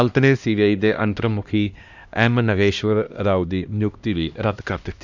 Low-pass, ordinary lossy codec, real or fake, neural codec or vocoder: 7.2 kHz; none; fake; codec, 16 kHz, 1 kbps, X-Codec, HuBERT features, trained on LibriSpeech